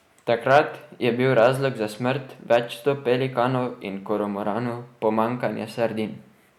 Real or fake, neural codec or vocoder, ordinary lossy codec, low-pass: real; none; none; 19.8 kHz